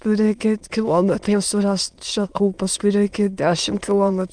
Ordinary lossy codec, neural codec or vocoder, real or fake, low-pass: Opus, 32 kbps; autoencoder, 22.05 kHz, a latent of 192 numbers a frame, VITS, trained on many speakers; fake; 9.9 kHz